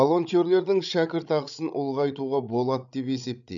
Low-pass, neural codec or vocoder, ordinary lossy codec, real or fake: 7.2 kHz; codec, 16 kHz, 16 kbps, FreqCodec, larger model; none; fake